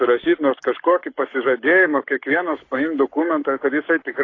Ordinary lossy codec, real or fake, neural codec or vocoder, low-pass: AAC, 32 kbps; fake; codec, 44.1 kHz, 7.8 kbps, Pupu-Codec; 7.2 kHz